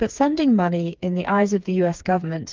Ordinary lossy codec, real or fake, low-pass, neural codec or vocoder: Opus, 32 kbps; fake; 7.2 kHz; codec, 16 kHz, 4 kbps, FreqCodec, smaller model